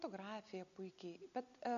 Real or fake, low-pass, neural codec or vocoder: real; 7.2 kHz; none